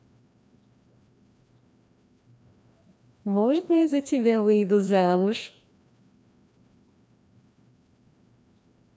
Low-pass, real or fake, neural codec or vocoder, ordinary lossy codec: none; fake; codec, 16 kHz, 1 kbps, FreqCodec, larger model; none